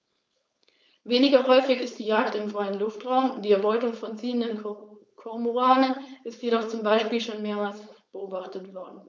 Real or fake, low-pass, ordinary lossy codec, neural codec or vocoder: fake; none; none; codec, 16 kHz, 4.8 kbps, FACodec